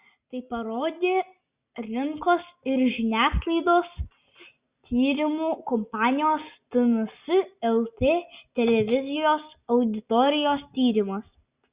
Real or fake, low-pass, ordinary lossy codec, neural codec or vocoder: real; 3.6 kHz; Opus, 64 kbps; none